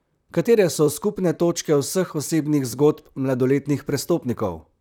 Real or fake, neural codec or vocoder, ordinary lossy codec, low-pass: fake; vocoder, 44.1 kHz, 128 mel bands, Pupu-Vocoder; none; 19.8 kHz